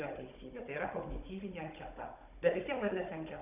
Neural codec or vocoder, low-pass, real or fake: codec, 16 kHz, 16 kbps, FunCodec, trained on Chinese and English, 50 frames a second; 3.6 kHz; fake